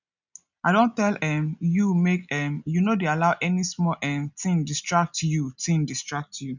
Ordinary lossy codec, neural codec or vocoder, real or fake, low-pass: none; none; real; 7.2 kHz